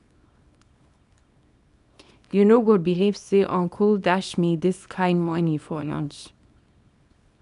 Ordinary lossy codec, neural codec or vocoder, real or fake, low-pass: none; codec, 24 kHz, 0.9 kbps, WavTokenizer, small release; fake; 10.8 kHz